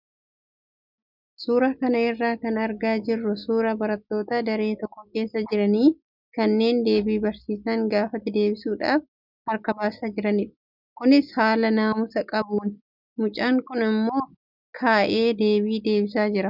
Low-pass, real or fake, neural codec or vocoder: 5.4 kHz; real; none